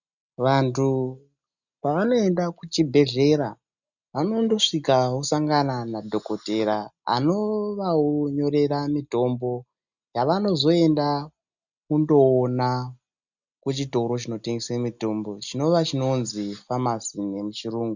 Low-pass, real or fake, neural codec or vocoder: 7.2 kHz; real; none